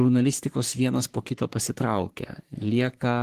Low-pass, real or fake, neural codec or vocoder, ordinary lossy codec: 14.4 kHz; fake; codec, 44.1 kHz, 3.4 kbps, Pupu-Codec; Opus, 16 kbps